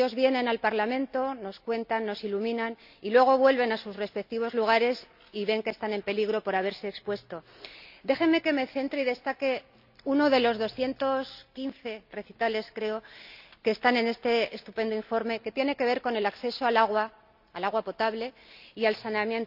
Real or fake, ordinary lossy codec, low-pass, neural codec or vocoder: real; none; 5.4 kHz; none